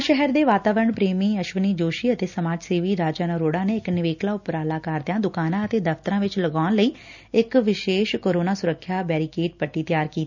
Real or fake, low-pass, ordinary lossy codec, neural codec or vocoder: real; 7.2 kHz; none; none